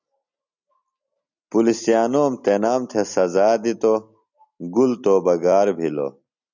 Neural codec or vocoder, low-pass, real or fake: none; 7.2 kHz; real